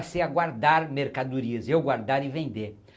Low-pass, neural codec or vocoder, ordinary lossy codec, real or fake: none; none; none; real